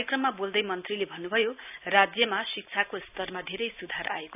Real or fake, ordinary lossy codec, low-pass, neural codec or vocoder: real; none; 3.6 kHz; none